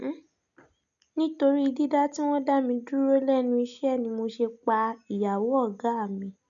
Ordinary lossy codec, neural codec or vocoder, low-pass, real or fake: none; none; 7.2 kHz; real